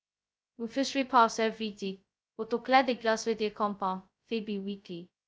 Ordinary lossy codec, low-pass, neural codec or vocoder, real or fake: none; none; codec, 16 kHz, 0.2 kbps, FocalCodec; fake